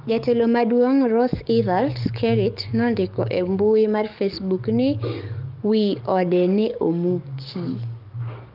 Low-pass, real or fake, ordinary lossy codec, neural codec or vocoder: 5.4 kHz; fake; Opus, 24 kbps; codec, 44.1 kHz, 7.8 kbps, DAC